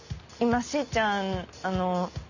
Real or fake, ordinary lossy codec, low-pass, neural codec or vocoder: real; none; 7.2 kHz; none